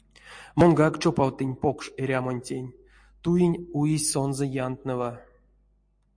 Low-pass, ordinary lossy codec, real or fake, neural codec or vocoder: 9.9 kHz; MP3, 48 kbps; real; none